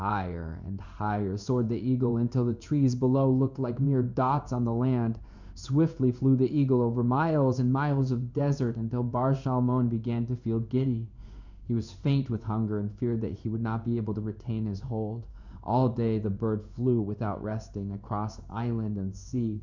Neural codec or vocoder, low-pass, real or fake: codec, 16 kHz in and 24 kHz out, 1 kbps, XY-Tokenizer; 7.2 kHz; fake